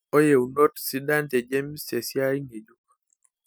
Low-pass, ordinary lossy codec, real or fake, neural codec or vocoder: none; none; real; none